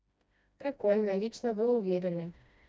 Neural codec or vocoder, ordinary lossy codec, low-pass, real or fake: codec, 16 kHz, 1 kbps, FreqCodec, smaller model; none; none; fake